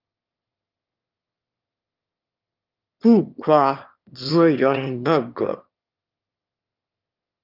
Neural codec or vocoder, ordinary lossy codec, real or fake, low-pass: autoencoder, 22.05 kHz, a latent of 192 numbers a frame, VITS, trained on one speaker; Opus, 24 kbps; fake; 5.4 kHz